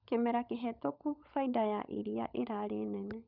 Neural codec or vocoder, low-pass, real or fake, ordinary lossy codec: codec, 16 kHz, 16 kbps, FunCodec, trained on LibriTTS, 50 frames a second; 5.4 kHz; fake; none